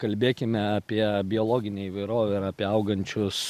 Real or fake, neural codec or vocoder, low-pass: real; none; 14.4 kHz